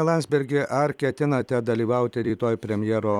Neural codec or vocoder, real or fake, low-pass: vocoder, 44.1 kHz, 128 mel bands every 256 samples, BigVGAN v2; fake; 19.8 kHz